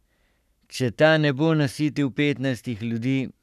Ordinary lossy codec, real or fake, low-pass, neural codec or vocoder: none; fake; 14.4 kHz; codec, 44.1 kHz, 7.8 kbps, Pupu-Codec